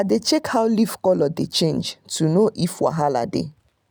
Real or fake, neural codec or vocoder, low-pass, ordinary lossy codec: real; none; none; none